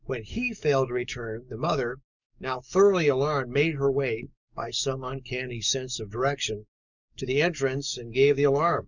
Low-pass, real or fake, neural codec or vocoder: 7.2 kHz; fake; codec, 44.1 kHz, 7.8 kbps, DAC